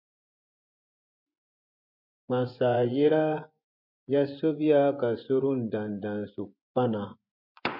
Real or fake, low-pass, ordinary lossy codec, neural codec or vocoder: fake; 5.4 kHz; MP3, 48 kbps; vocoder, 24 kHz, 100 mel bands, Vocos